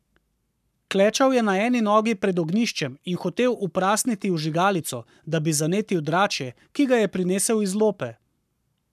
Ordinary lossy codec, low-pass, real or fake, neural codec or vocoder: none; 14.4 kHz; fake; codec, 44.1 kHz, 7.8 kbps, Pupu-Codec